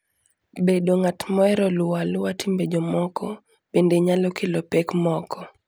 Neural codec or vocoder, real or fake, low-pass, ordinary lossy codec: none; real; none; none